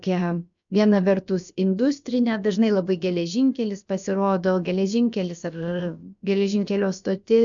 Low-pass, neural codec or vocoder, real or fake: 7.2 kHz; codec, 16 kHz, about 1 kbps, DyCAST, with the encoder's durations; fake